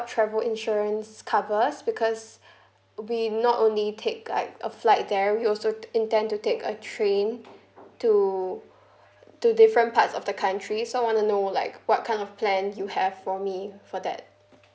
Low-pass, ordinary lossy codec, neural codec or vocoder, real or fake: none; none; none; real